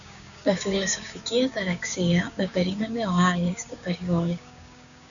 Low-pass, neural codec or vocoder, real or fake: 7.2 kHz; codec, 16 kHz, 6 kbps, DAC; fake